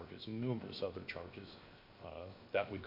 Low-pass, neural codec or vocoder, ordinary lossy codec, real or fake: 5.4 kHz; codec, 16 kHz, 0.7 kbps, FocalCodec; MP3, 32 kbps; fake